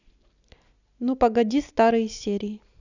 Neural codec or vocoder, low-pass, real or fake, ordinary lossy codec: none; 7.2 kHz; real; none